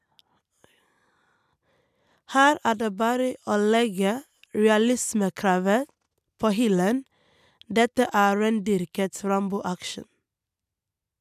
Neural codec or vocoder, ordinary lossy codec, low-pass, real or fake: none; none; 14.4 kHz; real